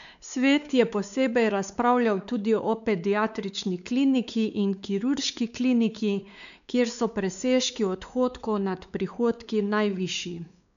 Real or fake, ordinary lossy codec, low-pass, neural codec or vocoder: fake; none; 7.2 kHz; codec, 16 kHz, 4 kbps, X-Codec, WavLM features, trained on Multilingual LibriSpeech